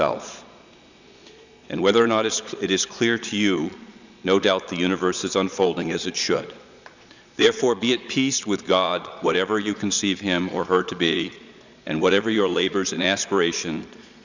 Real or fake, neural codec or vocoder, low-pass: fake; vocoder, 22.05 kHz, 80 mel bands, Vocos; 7.2 kHz